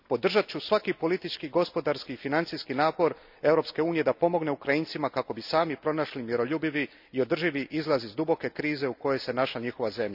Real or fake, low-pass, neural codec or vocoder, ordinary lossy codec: real; 5.4 kHz; none; none